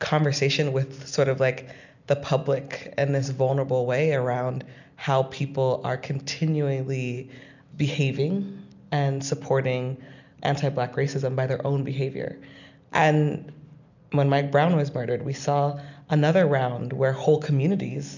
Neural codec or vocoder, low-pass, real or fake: none; 7.2 kHz; real